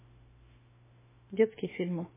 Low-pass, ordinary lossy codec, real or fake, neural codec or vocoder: 3.6 kHz; MP3, 16 kbps; fake; autoencoder, 48 kHz, 32 numbers a frame, DAC-VAE, trained on Japanese speech